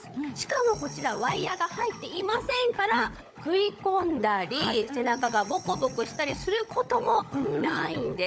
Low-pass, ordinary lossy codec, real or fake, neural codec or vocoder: none; none; fake; codec, 16 kHz, 16 kbps, FunCodec, trained on LibriTTS, 50 frames a second